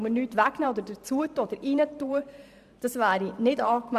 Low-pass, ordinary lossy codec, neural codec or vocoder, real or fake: 14.4 kHz; Opus, 64 kbps; vocoder, 44.1 kHz, 128 mel bands every 512 samples, BigVGAN v2; fake